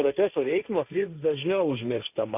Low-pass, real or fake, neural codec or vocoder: 3.6 kHz; fake; codec, 16 kHz, 1.1 kbps, Voila-Tokenizer